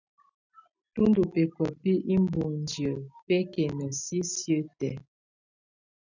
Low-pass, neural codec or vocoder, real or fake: 7.2 kHz; none; real